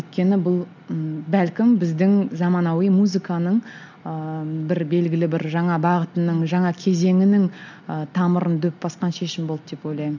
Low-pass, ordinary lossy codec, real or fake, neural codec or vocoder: 7.2 kHz; none; real; none